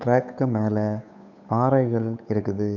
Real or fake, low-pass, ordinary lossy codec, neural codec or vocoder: fake; 7.2 kHz; none; codec, 16 kHz, 8 kbps, FunCodec, trained on Chinese and English, 25 frames a second